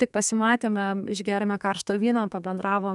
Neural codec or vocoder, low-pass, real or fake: codec, 32 kHz, 1.9 kbps, SNAC; 10.8 kHz; fake